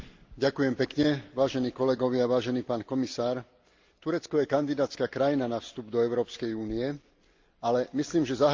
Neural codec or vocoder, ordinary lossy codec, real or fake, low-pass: none; Opus, 24 kbps; real; 7.2 kHz